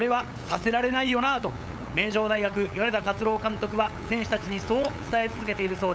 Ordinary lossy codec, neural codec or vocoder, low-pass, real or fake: none; codec, 16 kHz, 8 kbps, FunCodec, trained on LibriTTS, 25 frames a second; none; fake